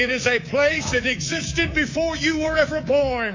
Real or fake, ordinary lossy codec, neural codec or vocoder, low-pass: fake; AAC, 48 kbps; codec, 24 kHz, 3.1 kbps, DualCodec; 7.2 kHz